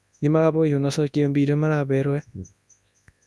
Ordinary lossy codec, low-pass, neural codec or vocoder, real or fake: none; none; codec, 24 kHz, 0.9 kbps, WavTokenizer, large speech release; fake